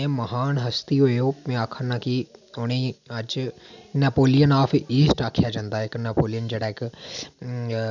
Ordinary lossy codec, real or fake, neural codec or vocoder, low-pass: none; real; none; 7.2 kHz